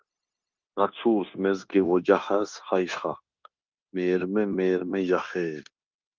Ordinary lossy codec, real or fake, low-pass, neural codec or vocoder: Opus, 32 kbps; fake; 7.2 kHz; codec, 16 kHz, 0.9 kbps, LongCat-Audio-Codec